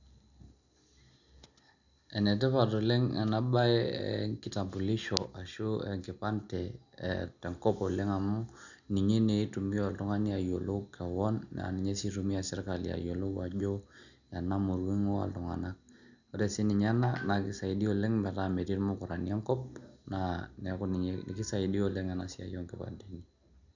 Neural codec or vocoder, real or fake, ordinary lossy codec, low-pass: none; real; none; 7.2 kHz